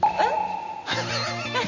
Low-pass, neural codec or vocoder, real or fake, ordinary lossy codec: 7.2 kHz; none; real; none